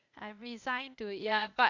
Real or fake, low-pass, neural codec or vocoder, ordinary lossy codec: fake; 7.2 kHz; codec, 16 kHz, 0.8 kbps, ZipCodec; none